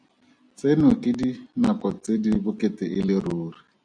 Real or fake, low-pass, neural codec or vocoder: real; 9.9 kHz; none